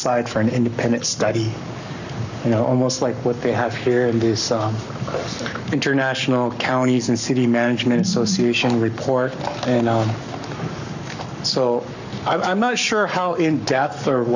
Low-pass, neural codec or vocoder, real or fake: 7.2 kHz; codec, 44.1 kHz, 7.8 kbps, Pupu-Codec; fake